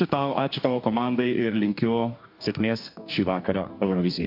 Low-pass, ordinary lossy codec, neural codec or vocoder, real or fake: 5.4 kHz; MP3, 48 kbps; codec, 44.1 kHz, 2.6 kbps, DAC; fake